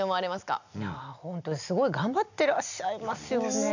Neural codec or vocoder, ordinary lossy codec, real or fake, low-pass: none; none; real; 7.2 kHz